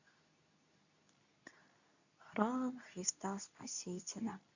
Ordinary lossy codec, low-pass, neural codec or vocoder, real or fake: none; 7.2 kHz; codec, 24 kHz, 0.9 kbps, WavTokenizer, medium speech release version 2; fake